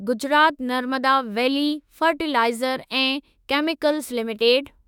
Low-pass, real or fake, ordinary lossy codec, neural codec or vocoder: 19.8 kHz; fake; none; autoencoder, 48 kHz, 32 numbers a frame, DAC-VAE, trained on Japanese speech